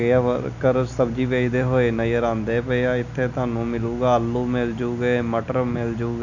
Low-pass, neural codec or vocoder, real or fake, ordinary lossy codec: 7.2 kHz; none; real; none